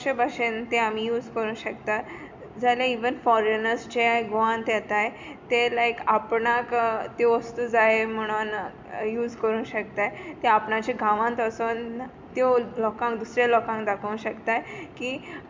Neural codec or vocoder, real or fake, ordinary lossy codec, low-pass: none; real; MP3, 64 kbps; 7.2 kHz